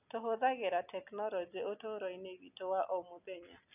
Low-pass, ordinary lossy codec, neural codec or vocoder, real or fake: 3.6 kHz; none; none; real